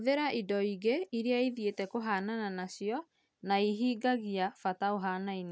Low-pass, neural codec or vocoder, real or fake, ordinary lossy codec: none; none; real; none